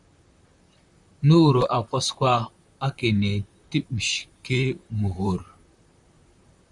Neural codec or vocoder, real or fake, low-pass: vocoder, 44.1 kHz, 128 mel bands, Pupu-Vocoder; fake; 10.8 kHz